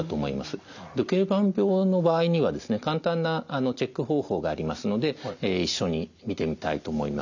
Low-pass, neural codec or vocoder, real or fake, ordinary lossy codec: 7.2 kHz; none; real; none